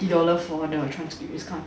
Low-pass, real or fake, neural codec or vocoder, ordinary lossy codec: none; real; none; none